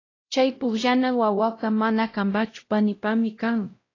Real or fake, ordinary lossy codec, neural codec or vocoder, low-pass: fake; AAC, 32 kbps; codec, 16 kHz, 0.5 kbps, X-Codec, WavLM features, trained on Multilingual LibriSpeech; 7.2 kHz